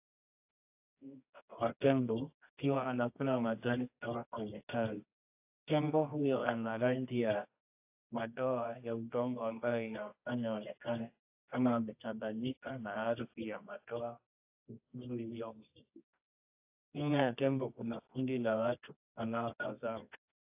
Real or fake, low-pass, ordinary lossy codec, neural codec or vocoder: fake; 3.6 kHz; AAC, 32 kbps; codec, 24 kHz, 0.9 kbps, WavTokenizer, medium music audio release